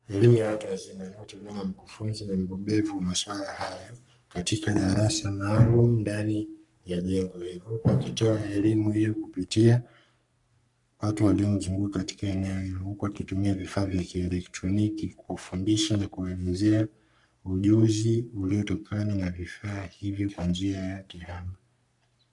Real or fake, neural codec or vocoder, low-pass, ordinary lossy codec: fake; codec, 44.1 kHz, 3.4 kbps, Pupu-Codec; 10.8 kHz; MP3, 96 kbps